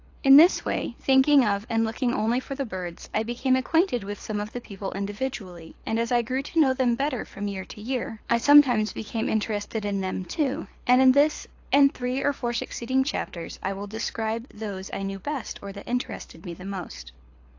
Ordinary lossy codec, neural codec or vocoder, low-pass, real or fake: AAC, 48 kbps; codec, 24 kHz, 6 kbps, HILCodec; 7.2 kHz; fake